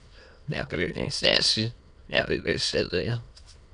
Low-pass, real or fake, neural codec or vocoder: 9.9 kHz; fake; autoencoder, 22.05 kHz, a latent of 192 numbers a frame, VITS, trained on many speakers